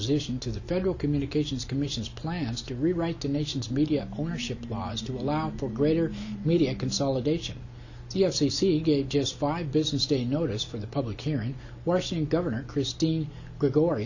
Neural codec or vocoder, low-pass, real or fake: none; 7.2 kHz; real